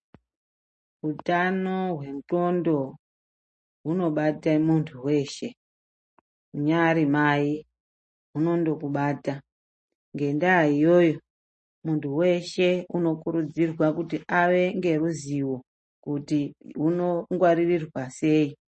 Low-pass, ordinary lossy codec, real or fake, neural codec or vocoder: 10.8 kHz; MP3, 32 kbps; real; none